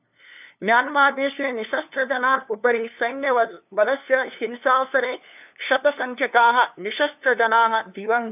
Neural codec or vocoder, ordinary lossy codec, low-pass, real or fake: codec, 16 kHz, 2 kbps, FunCodec, trained on LibriTTS, 25 frames a second; none; 3.6 kHz; fake